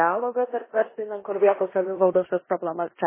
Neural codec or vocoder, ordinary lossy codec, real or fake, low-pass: codec, 16 kHz in and 24 kHz out, 0.9 kbps, LongCat-Audio-Codec, four codebook decoder; MP3, 16 kbps; fake; 3.6 kHz